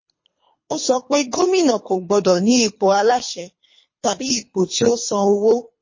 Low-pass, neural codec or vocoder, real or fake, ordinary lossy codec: 7.2 kHz; codec, 24 kHz, 3 kbps, HILCodec; fake; MP3, 32 kbps